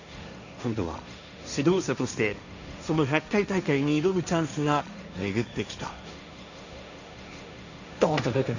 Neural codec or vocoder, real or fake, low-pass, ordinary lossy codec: codec, 16 kHz, 1.1 kbps, Voila-Tokenizer; fake; 7.2 kHz; none